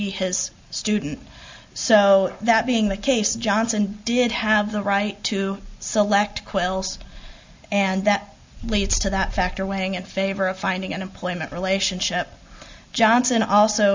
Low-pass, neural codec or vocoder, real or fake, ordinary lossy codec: 7.2 kHz; none; real; MP3, 64 kbps